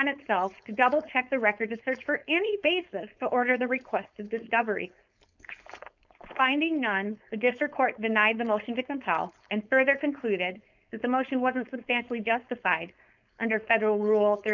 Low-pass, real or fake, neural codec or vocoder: 7.2 kHz; fake; codec, 16 kHz, 4.8 kbps, FACodec